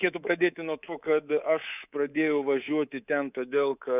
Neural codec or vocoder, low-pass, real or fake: codec, 24 kHz, 3.1 kbps, DualCodec; 3.6 kHz; fake